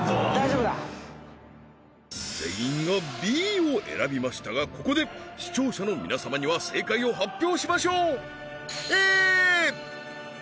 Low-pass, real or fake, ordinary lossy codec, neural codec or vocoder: none; real; none; none